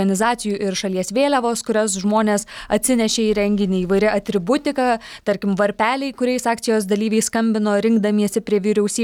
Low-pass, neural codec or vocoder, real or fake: 19.8 kHz; none; real